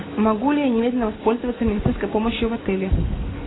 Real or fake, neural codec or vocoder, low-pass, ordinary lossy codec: real; none; 7.2 kHz; AAC, 16 kbps